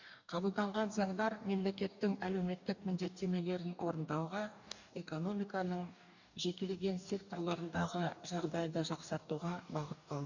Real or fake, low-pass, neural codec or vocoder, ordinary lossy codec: fake; 7.2 kHz; codec, 44.1 kHz, 2.6 kbps, DAC; none